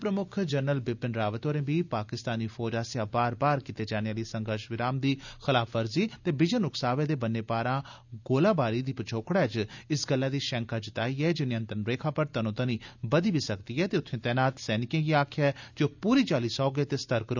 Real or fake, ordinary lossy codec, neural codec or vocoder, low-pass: real; none; none; 7.2 kHz